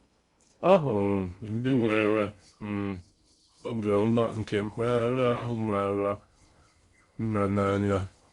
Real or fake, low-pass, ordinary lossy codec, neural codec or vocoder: fake; 10.8 kHz; Opus, 64 kbps; codec, 16 kHz in and 24 kHz out, 0.6 kbps, FocalCodec, streaming, 2048 codes